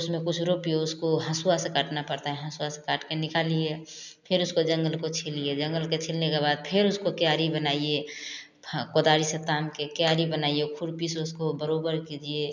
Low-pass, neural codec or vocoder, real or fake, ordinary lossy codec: 7.2 kHz; none; real; none